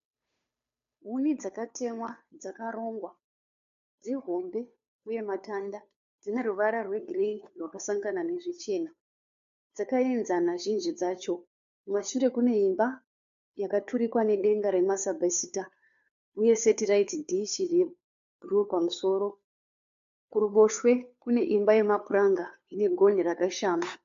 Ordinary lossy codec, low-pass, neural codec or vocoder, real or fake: AAC, 64 kbps; 7.2 kHz; codec, 16 kHz, 2 kbps, FunCodec, trained on Chinese and English, 25 frames a second; fake